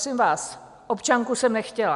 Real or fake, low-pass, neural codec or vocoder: real; 10.8 kHz; none